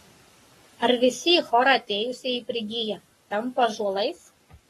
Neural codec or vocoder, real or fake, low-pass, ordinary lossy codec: codec, 44.1 kHz, 7.8 kbps, Pupu-Codec; fake; 19.8 kHz; AAC, 32 kbps